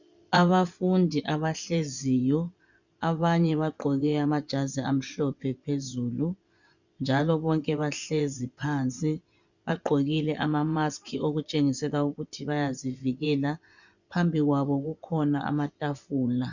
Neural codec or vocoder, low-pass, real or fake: vocoder, 24 kHz, 100 mel bands, Vocos; 7.2 kHz; fake